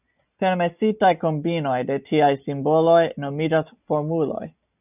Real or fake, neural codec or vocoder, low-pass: real; none; 3.6 kHz